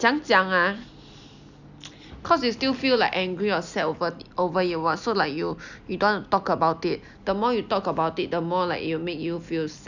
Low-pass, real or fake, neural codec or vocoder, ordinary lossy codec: 7.2 kHz; real; none; none